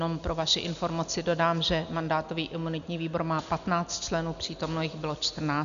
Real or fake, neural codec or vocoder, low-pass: real; none; 7.2 kHz